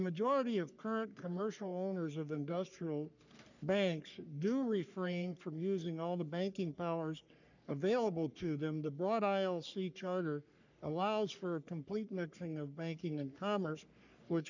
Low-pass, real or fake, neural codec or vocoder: 7.2 kHz; fake; codec, 44.1 kHz, 3.4 kbps, Pupu-Codec